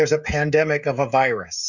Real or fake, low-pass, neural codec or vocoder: fake; 7.2 kHz; codec, 16 kHz, 16 kbps, FreqCodec, smaller model